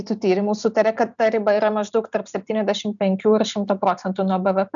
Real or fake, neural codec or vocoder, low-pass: real; none; 7.2 kHz